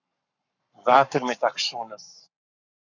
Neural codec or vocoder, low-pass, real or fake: codec, 44.1 kHz, 7.8 kbps, Pupu-Codec; 7.2 kHz; fake